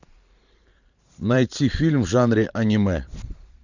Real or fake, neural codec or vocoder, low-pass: fake; vocoder, 44.1 kHz, 128 mel bands every 512 samples, BigVGAN v2; 7.2 kHz